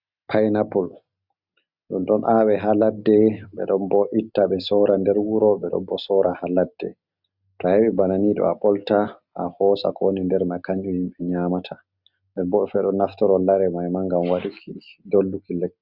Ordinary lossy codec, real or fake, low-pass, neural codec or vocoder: Opus, 64 kbps; real; 5.4 kHz; none